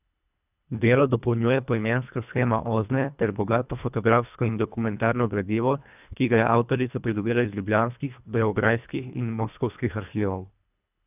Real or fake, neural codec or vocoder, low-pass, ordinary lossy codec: fake; codec, 24 kHz, 1.5 kbps, HILCodec; 3.6 kHz; none